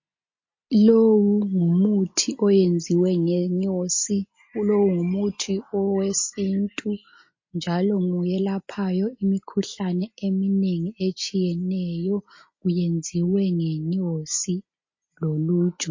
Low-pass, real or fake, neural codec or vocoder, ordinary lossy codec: 7.2 kHz; real; none; MP3, 32 kbps